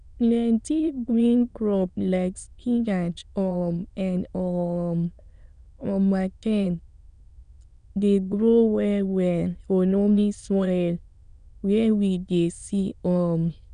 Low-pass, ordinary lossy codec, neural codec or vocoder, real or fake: 9.9 kHz; none; autoencoder, 22.05 kHz, a latent of 192 numbers a frame, VITS, trained on many speakers; fake